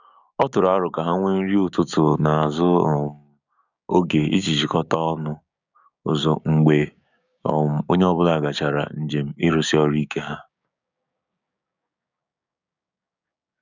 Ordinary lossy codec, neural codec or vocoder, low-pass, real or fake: none; codec, 16 kHz, 6 kbps, DAC; 7.2 kHz; fake